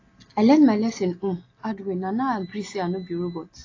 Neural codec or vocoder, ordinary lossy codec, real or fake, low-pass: none; AAC, 32 kbps; real; 7.2 kHz